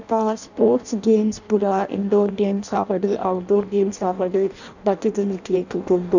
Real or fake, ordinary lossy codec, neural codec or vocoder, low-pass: fake; none; codec, 16 kHz in and 24 kHz out, 0.6 kbps, FireRedTTS-2 codec; 7.2 kHz